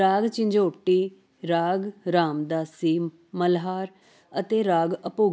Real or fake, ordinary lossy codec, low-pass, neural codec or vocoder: real; none; none; none